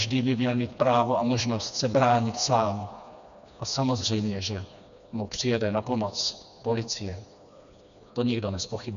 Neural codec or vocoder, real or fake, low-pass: codec, 16 kHz, 2 kbps, FreqCodec, smaller model; fake; 7.2 kHz